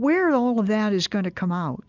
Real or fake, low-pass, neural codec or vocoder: real; 7.2 kHz; none